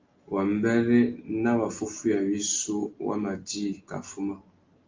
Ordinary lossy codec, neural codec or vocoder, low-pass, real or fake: Opus, 32 kbps; none; 7.2 kHz; real